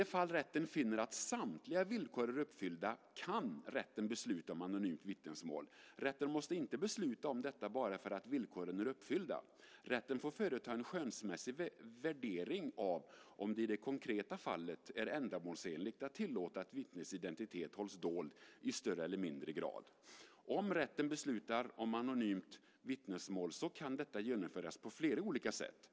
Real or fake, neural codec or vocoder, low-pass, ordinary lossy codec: real; none; none; none